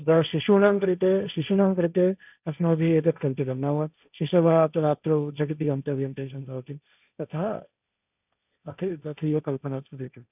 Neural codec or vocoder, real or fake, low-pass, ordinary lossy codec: codec, 16 kHz, 1.1 kbps, Voila-Tokenizer; fake; 3.6 kHz; none